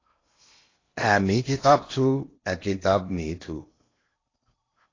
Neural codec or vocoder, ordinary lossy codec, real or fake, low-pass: codec, 16 kHz in and 24 kHz out, 0.8 kbps, FocalCodec, streaming, 65536 codes; AAC, 32 kbps; fake; 7.2 kHz